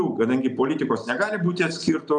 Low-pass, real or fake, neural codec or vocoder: 9.9 kHz; real; none